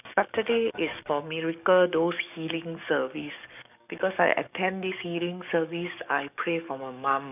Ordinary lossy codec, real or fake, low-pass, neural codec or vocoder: none; fake; 3.6 kHz; codec, 44.1 kHz, 7.8 kbps, DAC